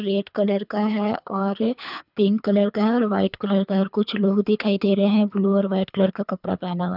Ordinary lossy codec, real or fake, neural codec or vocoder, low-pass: none; fake; codec, 24 kHz, 3 kbps, HILCodec; 5.4 kHz